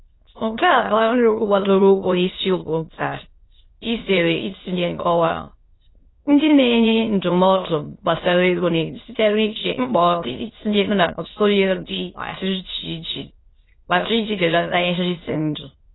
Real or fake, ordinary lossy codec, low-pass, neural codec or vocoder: fake; AAC, 16 kbps; 7.2 kHz; autoencoder, 22.05 kHz, a latent of 192 numbers a frame, VITS, trained on many speakers